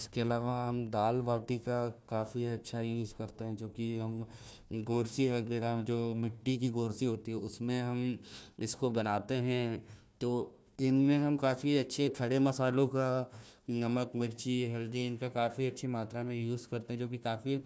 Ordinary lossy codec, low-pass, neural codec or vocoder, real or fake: none; none; codec, 16 kHz, 1 kbps, FunCodec, trained on Chinese and English, 50 frames a second; fake